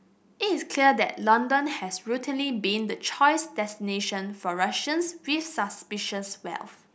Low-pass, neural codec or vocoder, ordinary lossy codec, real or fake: none; none; none; real